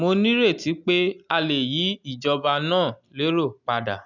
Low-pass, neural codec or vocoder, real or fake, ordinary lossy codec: 7.2 kHz; none; real; none